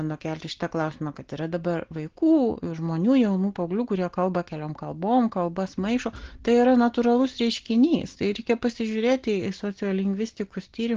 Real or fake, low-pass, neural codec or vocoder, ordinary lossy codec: real; 7.2 kHz; none; Opus, 16 kbps